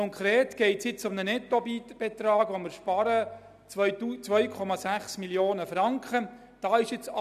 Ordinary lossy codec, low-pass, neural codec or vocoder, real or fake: none; 14.4 kHz; none; real